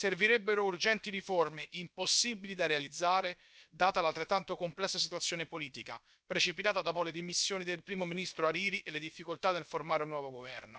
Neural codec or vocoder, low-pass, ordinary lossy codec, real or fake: codec, 16 kHz, about 1 kbps, DyCAST, with the encoder's durations; none; none; fake